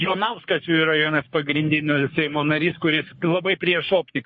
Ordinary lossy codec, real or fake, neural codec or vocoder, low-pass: MP3, 32 kbps; fake; codec, 44.1 kHz, 3.4 kbps, Pupu-Codec; 10.8 kHz